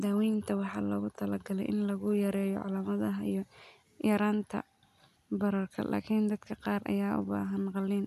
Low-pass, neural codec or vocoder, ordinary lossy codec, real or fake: 14.4 kHz; none; none; real